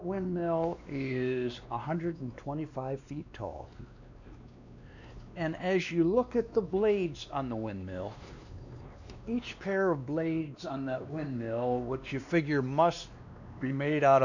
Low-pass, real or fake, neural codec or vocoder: 7.2 kHz; fake; codec, 16 kHz, 2 kbps, X-Codec, WavLM features, trained on Multilingual LibriSpeech